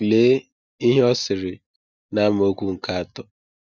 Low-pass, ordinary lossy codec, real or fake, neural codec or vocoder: 7.2 kHz; none; real; none